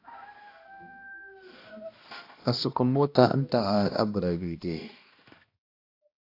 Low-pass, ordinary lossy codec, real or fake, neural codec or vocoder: 5.4 kHz; AAC, 32 kbps; fake; codec, 16 kHz, 1 kbps, X-Codec, HuBERT features, trained on balanced general audio